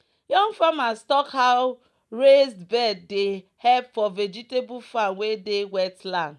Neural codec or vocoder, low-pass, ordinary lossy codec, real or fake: none; none; none; real